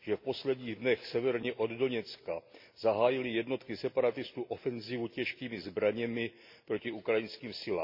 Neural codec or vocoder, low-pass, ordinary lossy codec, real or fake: none; 5.4 kHz; MP3, 32 kbps; real